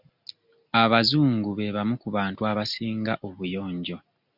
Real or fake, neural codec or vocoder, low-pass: real; none; 5.4 kHz